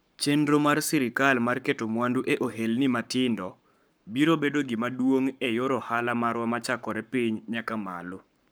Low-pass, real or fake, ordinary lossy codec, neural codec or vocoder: none; fake; none; codec, 44.1 kHz, 7.8 kbps, Pupu-Codec